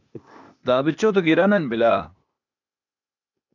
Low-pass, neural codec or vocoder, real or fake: 7.2 kHz; codec, 16 kHz, 0.8 kbps, ZipCodec; fake